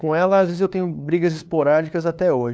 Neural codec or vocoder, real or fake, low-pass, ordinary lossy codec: codec, 16 kHz, 2 kbps, FunCodec, trained on LibriTTS, 25 frames a second; fake; none; none